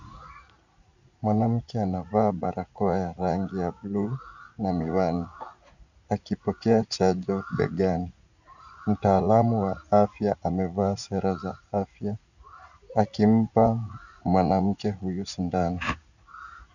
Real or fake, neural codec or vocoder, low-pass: fake; vocoder, 44.1 kHz, 80 mel bands, Vocos; 7.2 kHz